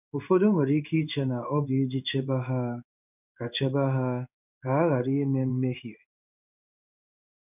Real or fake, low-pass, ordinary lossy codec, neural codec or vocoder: fake; 3.6 kHz; none; codec, 16 kHz in and 24 kHz out, 1 kbps, XY-Tokenizer